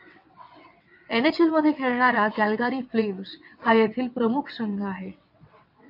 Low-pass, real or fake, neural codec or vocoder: 5.4 kHz; fake; vocoder, 22.05 kHz, 80 mel bands, WaveNeXt